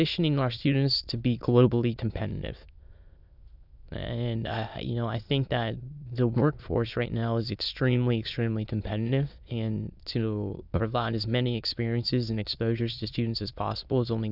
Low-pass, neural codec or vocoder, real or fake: 5.4 kHz; autoencoder, 22.05 kHz, a latent of 192 numbers a frame, VITS, trained on many speakers; fake